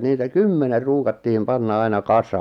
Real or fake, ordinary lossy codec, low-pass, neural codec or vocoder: real; none; 19.8 kHz; none